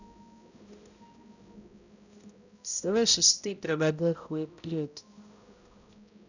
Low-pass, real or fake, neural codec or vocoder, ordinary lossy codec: 7.2 kHz; fake; codec, 16 kHz, 0.5 kbps, X-Codec, HuBERT features, trained on balanced general audio; none